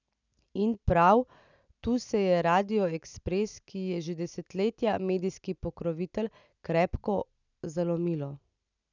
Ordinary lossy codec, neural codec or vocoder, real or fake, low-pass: none; none; real; 7.2 kHz